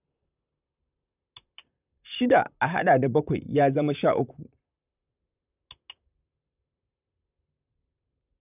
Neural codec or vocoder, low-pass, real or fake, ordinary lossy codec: vocoder, 44.1 kHz, 128 mel bands, Pupu-Vocoder; 3.6 kHz; fake; none